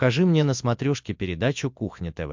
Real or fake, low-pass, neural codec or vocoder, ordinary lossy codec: real; 7.2 kHz; none; MP3, 64 kbps